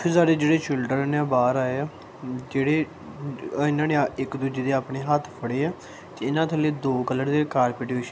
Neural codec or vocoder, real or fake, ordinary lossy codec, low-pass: none; real; none; none